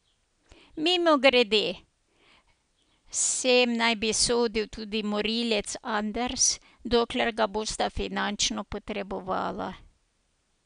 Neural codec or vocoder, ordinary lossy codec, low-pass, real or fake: none; none; 9.9 kHz; real